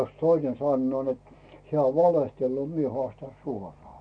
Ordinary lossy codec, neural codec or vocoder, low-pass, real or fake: MP3, 48 kbps; none; 9.9 kHz; real